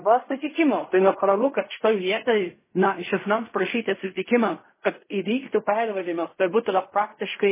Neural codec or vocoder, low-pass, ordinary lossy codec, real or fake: codec, 16 kHz in and 24 kHz out, 0.4 kbps, LongCat-Audio-Codec, fine tuned four codebook decoder; 3.6 kHz; MP3, 16 kbps; fake